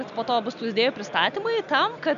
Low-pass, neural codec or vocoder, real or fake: 7.2 kHz; none; real